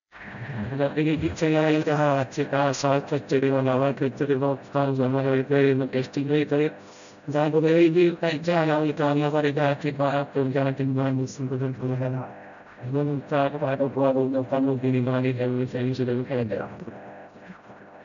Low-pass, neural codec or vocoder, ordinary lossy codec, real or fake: 7.2 kHz; codec, 16 kHz, 0.5 kbps, FreqCodec, smaller model; none; fake